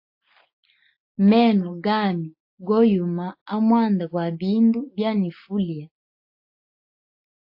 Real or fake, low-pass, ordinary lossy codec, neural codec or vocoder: real; 5.4 kHz; AAC, 48 kbps; none